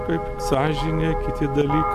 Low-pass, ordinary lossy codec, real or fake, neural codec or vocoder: 14.4 kHz; MP3, 96 kbps; real; none